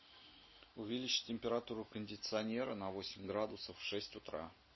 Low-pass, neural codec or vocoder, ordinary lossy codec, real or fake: 7.2 kHz; none; MP3, 24 kbps; real